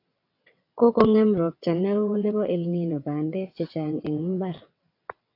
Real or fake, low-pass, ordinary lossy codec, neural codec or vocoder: fake; 5.4 kHz; AAC, 32 kbps; vocoder, 22.05 kHz, 80 mel bands, WaveNeXt